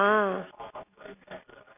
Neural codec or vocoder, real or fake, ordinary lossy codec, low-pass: none; real; none; 3.6 kHz